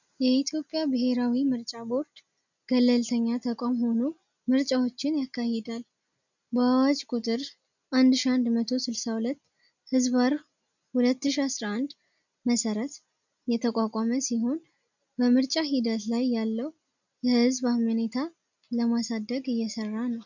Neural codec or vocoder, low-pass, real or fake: none; 7.2 kHz; real